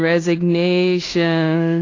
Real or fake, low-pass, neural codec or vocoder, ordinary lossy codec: fake; 7.2 kHz; codec, 16 kHz in and 24 kHz out, 0.9 kbps, LongCat-Audio-Codec, fine tuned four codebook decoder; AAC, 32 kbps